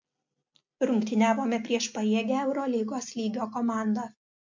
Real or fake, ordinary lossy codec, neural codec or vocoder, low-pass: real; MP3, 48 kbps; none; 7.2 kHz